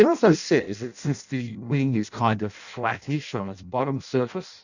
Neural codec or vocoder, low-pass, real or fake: codec, 16 kHz in and 24 kHz out, 0.6 kbps, FireRedTTS-2 codec; 7.2 kHz; fake